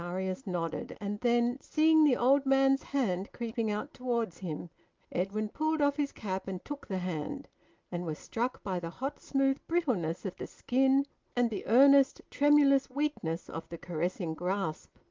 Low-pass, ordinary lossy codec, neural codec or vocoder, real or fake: 7.2 kHz; Opus, 32 kbps; none; real